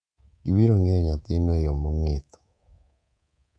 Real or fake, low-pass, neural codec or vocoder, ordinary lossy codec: fake; 9.9 kHz; codec, 24 kHz, 3.1 kbps, DualCodec; none